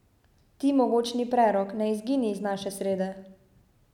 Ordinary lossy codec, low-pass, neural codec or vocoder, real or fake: none; 19.8 kHz; none; real